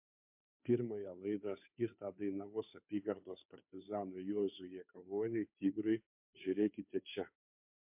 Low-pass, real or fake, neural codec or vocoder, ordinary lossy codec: 3.6 kHz; fake; codec, 16 kHz, 2 kbps, FunCodec, trained on Chinese and English, 25 frames a second; MP3, 32 kbps